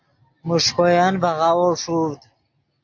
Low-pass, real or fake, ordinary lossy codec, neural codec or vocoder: 7.2 kHz; real; AAC, 48 kbps; none